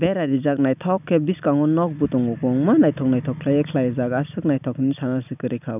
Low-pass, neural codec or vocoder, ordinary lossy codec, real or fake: 3.6 kHz; none; none; real